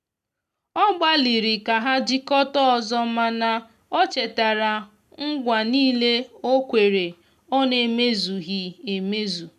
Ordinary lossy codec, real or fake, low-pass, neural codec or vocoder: MP3, 96 kbps; real; 14.4 kHz; none